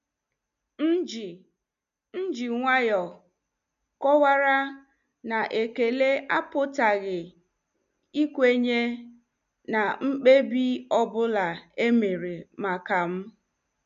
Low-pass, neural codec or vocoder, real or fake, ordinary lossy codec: 7.2 kHz; none; real; none